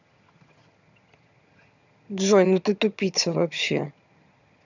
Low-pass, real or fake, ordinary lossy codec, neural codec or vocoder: 7.2 kHz; fake; none; vocoder, 22.05 kHz, 80 mel bands, HiFi-GAN